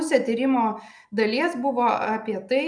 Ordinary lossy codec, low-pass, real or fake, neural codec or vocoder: MP3, 96 kbps; 9.9 kHz; real; none